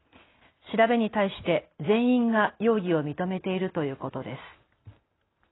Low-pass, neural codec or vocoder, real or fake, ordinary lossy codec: 7.2 kHz; none; real; AAC, 16 kbps